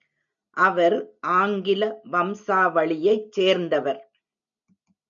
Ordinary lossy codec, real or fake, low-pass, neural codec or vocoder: MP3, 64 kbps; real; 7.2 kHz; none